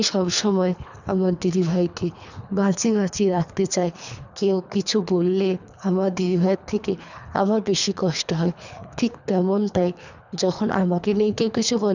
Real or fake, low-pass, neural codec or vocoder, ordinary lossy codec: fake; 7.2 kHz; codec, 24 kHz, 3 kbps, HILCodec; none